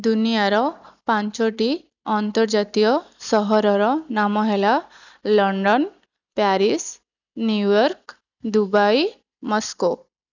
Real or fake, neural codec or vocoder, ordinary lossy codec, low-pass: real; none; none; 7.2 kHz